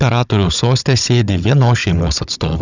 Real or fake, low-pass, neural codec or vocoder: fake; 7.2 kHz; codec, 16 kHz, 16 kbps, FunCodec, trained on Chinese and English, 50 frames a second